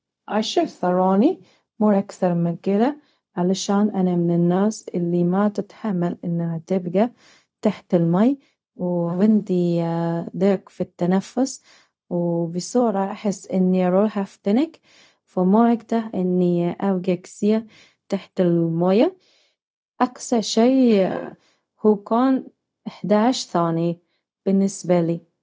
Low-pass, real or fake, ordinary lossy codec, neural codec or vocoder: none; fake; none; codec, 16 kHz, 0.4 kbps, LongCat-Audio-Codec